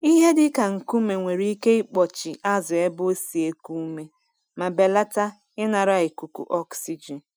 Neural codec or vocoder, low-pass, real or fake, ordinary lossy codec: none; none; real; none